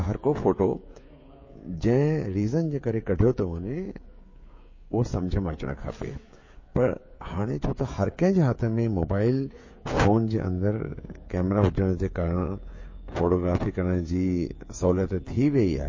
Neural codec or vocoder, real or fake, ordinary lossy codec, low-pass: codec, 16 kHz, 16 kbps, FreqCodec, smaller model; fake; MP3, 32 kbps; 7.2 kHz